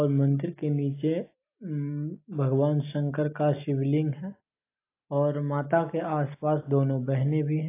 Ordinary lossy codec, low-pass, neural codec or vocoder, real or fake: AAC, 24 kbps; 3.6 kHz; none; real